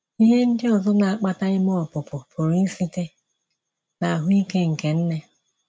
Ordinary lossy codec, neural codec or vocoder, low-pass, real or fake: none; none; none; real